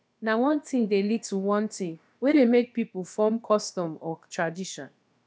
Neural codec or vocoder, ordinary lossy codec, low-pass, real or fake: codec, 16 kHz, about 1 kbps, DyCAST, with the encoder's durations; none; none; fake